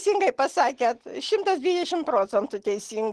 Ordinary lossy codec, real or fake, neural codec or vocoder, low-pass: Opus, 16 kbps; real; none; 10.8 kHz